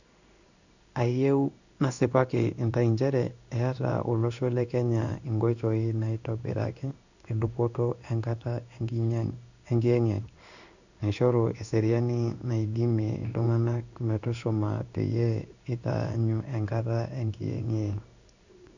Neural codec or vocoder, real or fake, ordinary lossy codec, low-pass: codec, 16 kHz in and 24 kHz out, 1 kbps, XY-Tokenizer; fake; none; 7.2 kHz